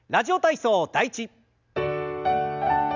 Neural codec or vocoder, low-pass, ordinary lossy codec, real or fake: none; 7.2 kHz; none; real